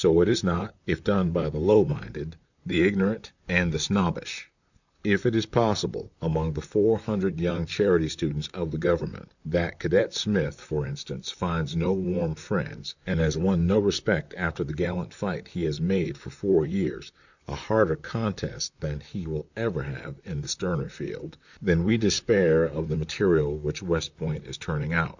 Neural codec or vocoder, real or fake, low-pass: vocoder, 44.1 kHz, 128 mel bands, Pupu-Vocoder; fake; 7.2 kHz